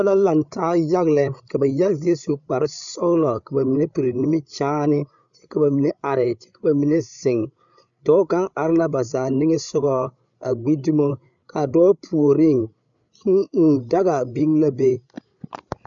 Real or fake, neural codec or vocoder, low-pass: fake; codec, 16 kHz, 8 kbps, FreqCodec, larger model; 7.2 kHz